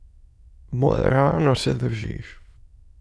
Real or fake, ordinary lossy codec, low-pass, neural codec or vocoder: fake; none; none; autoencoder, 22.05 kHz, a latent of 192 numbers a frame, VITS, trained on many speakers